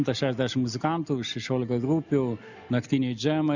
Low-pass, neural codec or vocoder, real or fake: 7.2 kHz; none; real